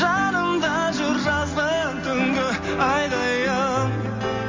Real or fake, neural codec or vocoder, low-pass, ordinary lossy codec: real; none; 7.2 kHz; MP3, 32 kbps